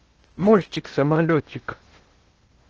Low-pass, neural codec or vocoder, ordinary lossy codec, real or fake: 7.2 kHz; codec, 16 kHz in and 24 kHz out, 0.6 kbps, FocalCodec, streaming, 2048 codes; Opus, 16 kbps; fake